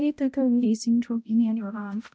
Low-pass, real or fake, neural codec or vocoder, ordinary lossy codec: none; fake; codec, 16 kHz, 0.5 kbps, X-Codec, HuBERT features, trained on balanced general audio; none